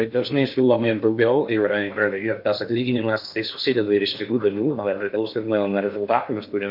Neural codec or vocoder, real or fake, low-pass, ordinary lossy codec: codec, 16 kHz in and 24 kHz out, 0.8 kbps, FocalCodec, streaming, 65536 codes; fake; 5.4 kHz; MP3, 48 kbps